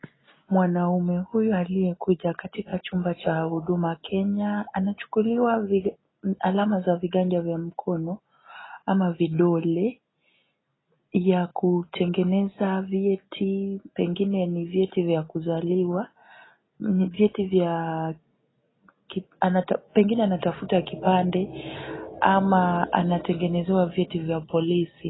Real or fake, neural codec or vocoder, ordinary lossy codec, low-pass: real; none; AAC, 16 kbps; 7.2 kHz